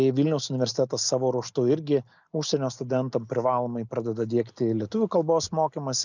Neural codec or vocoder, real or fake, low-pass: none; real; 7.2 kHz